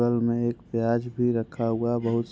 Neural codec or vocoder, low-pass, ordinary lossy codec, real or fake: none; none; none; real